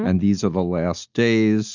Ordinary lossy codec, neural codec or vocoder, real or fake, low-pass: Opus, 64 kbps; none; real; 7.2 kHz